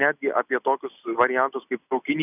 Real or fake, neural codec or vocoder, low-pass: real; none; 3.6 kHz